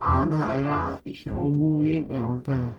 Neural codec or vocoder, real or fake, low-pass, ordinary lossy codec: codec, 44.1 kHz, 0.9 kbps, DAC; fake; 19.8 kHz; Opus, 32 kbps